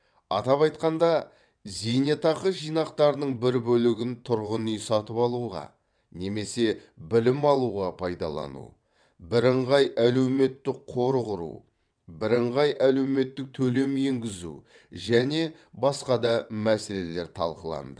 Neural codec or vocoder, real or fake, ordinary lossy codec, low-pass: vocoder, 22.05 kHz, 80 mel bands, WaveNeXt; fake; none; 9.9 kHz